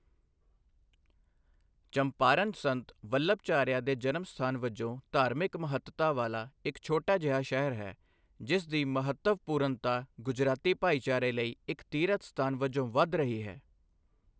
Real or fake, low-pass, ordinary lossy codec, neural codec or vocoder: real; none; none; none